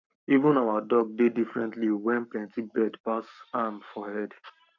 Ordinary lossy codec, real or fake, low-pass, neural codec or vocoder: none; fake; 7.2 kHz; codec, 44.1 kHz, 7.8 kbps, Pupu-Codec